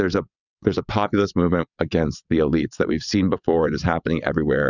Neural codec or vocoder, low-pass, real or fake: vocoder, 22.05 kHz, 80 mel bands, WaveNeXt; 7.2 kHz; fake